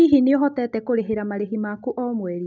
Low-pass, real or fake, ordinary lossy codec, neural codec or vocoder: 7.2 kHz; real; none; none